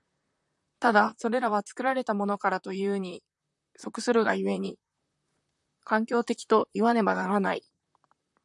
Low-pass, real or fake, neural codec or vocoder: 10.8 kHz; fake; vocoder, 44.1 kHz, 128 mel bands, Pupu-Vocoder